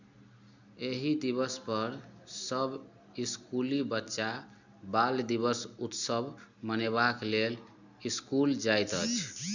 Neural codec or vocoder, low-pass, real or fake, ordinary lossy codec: none; 7.2 kHz; real; none